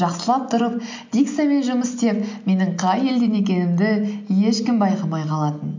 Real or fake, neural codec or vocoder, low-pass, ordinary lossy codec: real; none; 7.2 kHz; MP3, 48 kbps